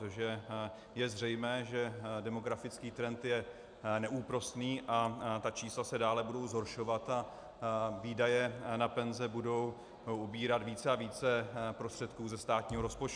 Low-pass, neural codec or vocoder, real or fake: 9.9 kHz; none; real